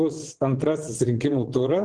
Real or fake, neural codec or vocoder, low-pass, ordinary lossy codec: fake; vocoder, 22.05 kHz, 80 mel bands, WaveNeXt; 9.9 kHz; Opus, 16 kbps